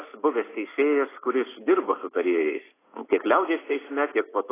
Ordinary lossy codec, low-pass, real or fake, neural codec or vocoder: AAC, 16 kbps; 3.6 kHz; real; none